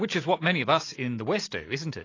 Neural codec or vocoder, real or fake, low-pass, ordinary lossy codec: none; real; 7.2 kHz; AAC, 32 kbps